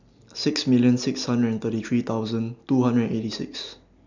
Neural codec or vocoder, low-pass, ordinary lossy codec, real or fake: none; 7.2 kHz; none; real